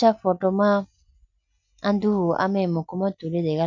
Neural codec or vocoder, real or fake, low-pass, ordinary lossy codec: none; real; 7.2 kHz; none